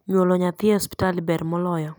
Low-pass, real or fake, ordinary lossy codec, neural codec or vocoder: none; real; none; none